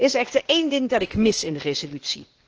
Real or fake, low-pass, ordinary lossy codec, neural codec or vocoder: fake; 7.2 kHz; Opus, 16 kbps; codec, 16 kHz, 0.8 kbps, ZipCodec